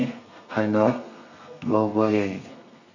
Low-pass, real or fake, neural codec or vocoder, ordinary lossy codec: 7.2 kHz; fake; codec, 32 kHz, 1.9 kbps, SNAC; none